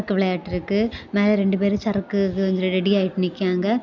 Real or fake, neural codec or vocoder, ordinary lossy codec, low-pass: real; none; none; 7.2 kHz